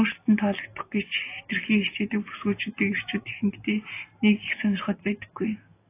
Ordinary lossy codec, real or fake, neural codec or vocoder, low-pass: AAC, 24 kbps; real; none; 3.6 kHz